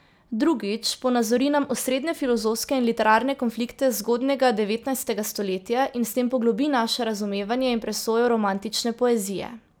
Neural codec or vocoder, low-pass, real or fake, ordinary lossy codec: none; none; real; none